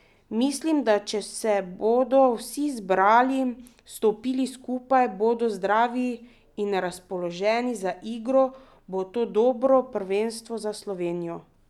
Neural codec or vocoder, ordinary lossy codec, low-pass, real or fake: none; none; 19.8 kHz; real